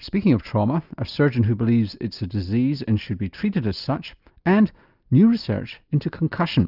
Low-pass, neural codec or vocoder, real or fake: 5.4 kHz; none; real